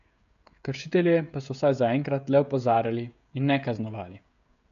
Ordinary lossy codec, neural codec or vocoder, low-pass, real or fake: MP3, 96 kbps; codec, 16 kHz, 16 kbps, FreqCodec, smaller model; 7.2 kHz; fake